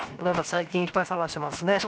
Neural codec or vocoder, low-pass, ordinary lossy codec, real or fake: codec, 16 kHz, 0.7 kbps, FocalCodec; none; none; fake